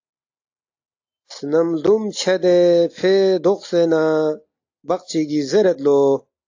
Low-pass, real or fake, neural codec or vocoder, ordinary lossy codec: 7.2 kHz; real; none; AAC, 48 kbps